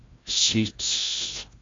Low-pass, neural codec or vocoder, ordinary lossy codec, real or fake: 7.2 kHz; codec, 16 kHz, 0.5 kbps, FreqCodec, larger model; AAC, 32 kbps; fake